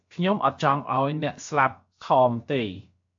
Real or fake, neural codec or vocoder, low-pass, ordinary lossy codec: fake; codec, 16 kHz, about 1 kbps, DyCAST, with the encoder's durations; 7.2 kHz; MP3, 48 kbps